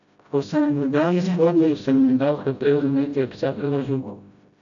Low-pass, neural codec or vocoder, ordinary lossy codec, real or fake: 7.2 kHz; codec, 16 kHz, 0.5 kbps, FreqCodec, smaller model; none; fake